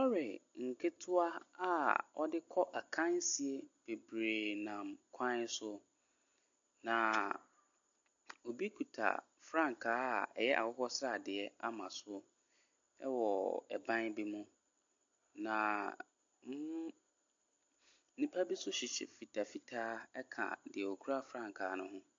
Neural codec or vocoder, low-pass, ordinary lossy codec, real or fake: none; 7.2 kHz; MP3, 48 kbps; real